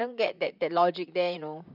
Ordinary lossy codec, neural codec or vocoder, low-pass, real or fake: none; vocoder, 44.1 kHz, 128 mel bands, Pupu-Vocoder; 5.4 kHz; fake